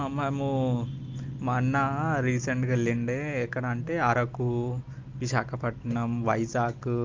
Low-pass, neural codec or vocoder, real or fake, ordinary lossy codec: 7.2 kHz; none; real; Opus, 32 kbps